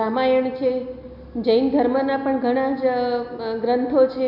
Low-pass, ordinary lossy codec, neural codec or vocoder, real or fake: 5.4 kHz; none; none; real